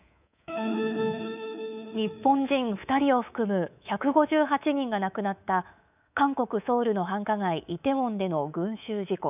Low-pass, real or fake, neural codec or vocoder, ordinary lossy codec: 3.6 kHz; fake; codec, 24 kHz, 3.1 kbps, DualCodec; none